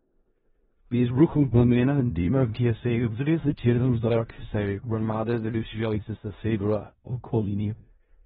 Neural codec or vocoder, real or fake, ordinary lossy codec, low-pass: codec, 16 kHz in and 24 kHz out, 0.4 kbps, LongCat-Audio-Codec, four codebook decoder; fake; AAC, 16 kbps; 10.8 kHz